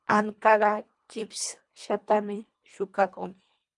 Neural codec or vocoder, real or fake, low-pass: codec, 24 kHz, 1.5 kbps, HILCodec; fake; 10.8 kHz